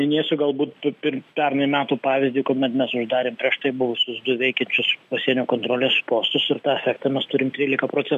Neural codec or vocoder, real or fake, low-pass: none; real; 14.4 kHz